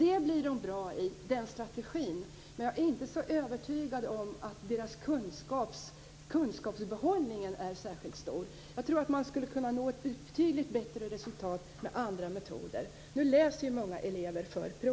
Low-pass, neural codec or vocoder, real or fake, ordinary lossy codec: none; none; real; none